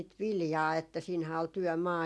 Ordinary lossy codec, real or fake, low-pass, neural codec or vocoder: none; real; none; none